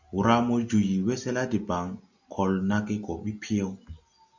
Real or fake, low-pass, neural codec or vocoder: real; 7.2 kHz; none